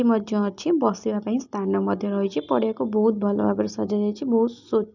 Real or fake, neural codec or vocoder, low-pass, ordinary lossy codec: real; none; 7.2 kHz; none